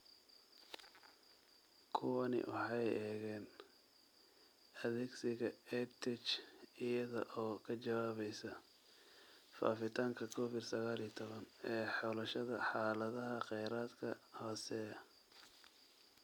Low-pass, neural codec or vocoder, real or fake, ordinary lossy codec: none; none; real; none